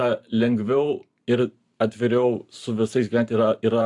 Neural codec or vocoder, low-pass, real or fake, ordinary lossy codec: vocoder, 44.1 kHz, 128 mel bands every 512 samples, BigVGAN v2; 10.8 kHz; fake; AAC, 64 kbps